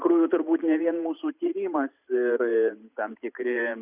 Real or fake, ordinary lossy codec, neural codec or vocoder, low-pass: fake; Opus, 64 kbps; vocoder, 44.1 kHz, 128 mel bands every 512 samples, BigVGAN v2; 3.6 kHz